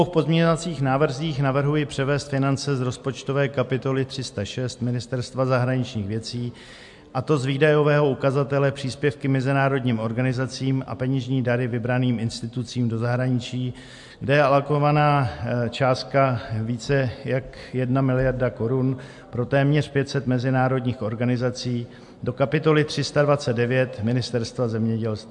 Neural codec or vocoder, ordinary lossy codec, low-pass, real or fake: none; MP3, 64 kbps; 10.8 kHz; real